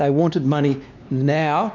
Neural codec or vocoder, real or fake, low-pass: codec, 16 kHz, 2 kbps, X-Codec, WavLM features, trained on Multilingual LibriSpeech; fake; 7.2 kHz